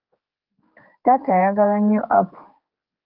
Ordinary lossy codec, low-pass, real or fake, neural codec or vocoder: Opus, 24 kbps; 5.4 kHz; fake; codec, 16 kHz, 4 kbps, X-Codec, HuBERT features, trained on general audio